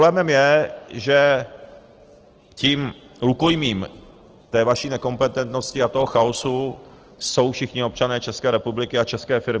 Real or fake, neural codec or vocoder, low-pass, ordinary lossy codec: real; none; 7.2 kHz; Opus, 16 kbps